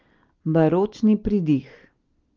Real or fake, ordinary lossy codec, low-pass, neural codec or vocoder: real; Opus, 32 kbps; 7.2 kHz; none